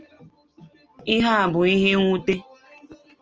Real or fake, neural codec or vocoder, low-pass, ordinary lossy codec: real; none; 7.2 kHz; Opus, 24 kbps